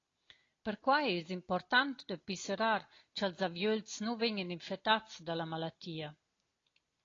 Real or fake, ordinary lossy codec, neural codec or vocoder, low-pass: real; AAC, 32 kbps; none; 7.2 kHz